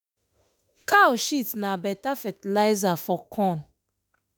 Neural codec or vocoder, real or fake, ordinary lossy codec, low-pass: autoencoder, 48 kHz, 32 numbers a frame, DAC-VAE, trained on Japanese speech; fake; none; none